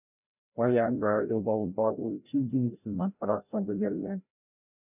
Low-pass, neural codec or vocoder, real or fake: 3.6 kHz; codec, 16 kHz, 0.5 kbps, FreqCodec, larger model; fake